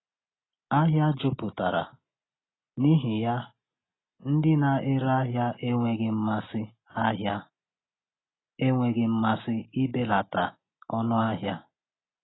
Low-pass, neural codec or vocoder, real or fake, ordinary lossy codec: 7.2 kHz; none; real; AAC, 16 kbps